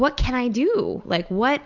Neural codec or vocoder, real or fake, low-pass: none; real; 7.2 kHz